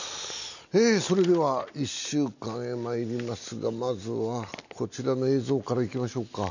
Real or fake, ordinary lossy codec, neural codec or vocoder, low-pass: real; none; none; 7.2 kHz